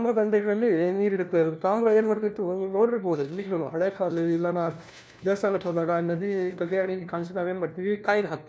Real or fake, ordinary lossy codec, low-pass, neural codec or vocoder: fake; none; none; codec, 16 kHz, 1 kbps, FunCodec, trained on LibriTTS, 50 frames a second